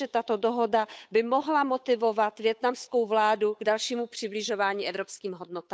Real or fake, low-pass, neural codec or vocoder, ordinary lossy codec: fake; none; codec, 16 kHz, 8 kbps, FunCodec, trained on Chinese and English, 25 frames a second; none